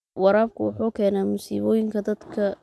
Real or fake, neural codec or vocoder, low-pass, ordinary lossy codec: real; none; none; none